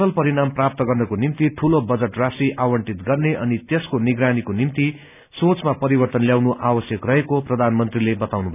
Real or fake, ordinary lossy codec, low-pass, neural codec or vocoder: real; none; 3.6 kHz; none